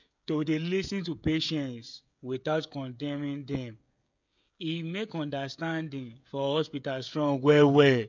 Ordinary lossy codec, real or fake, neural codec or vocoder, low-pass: none; fake; codec, 16 kHz, 16 kbps, FreqCodec, smaller model; 7.2 kHz